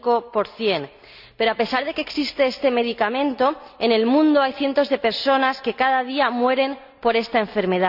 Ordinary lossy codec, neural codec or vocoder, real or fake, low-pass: none; none; real; 5.4 kHz